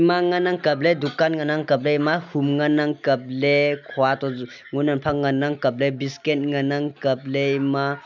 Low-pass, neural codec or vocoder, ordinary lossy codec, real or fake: 7.2 kHz; none; none; real